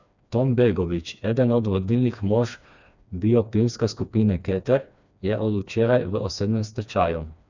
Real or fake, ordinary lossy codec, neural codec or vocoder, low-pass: fake; none; codec, 16 kHz, 2 kbps, FreqCodec, smaller model; 7.2 kHz